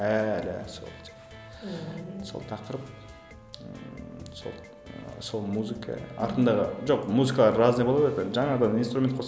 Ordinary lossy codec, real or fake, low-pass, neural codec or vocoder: none; real; none; none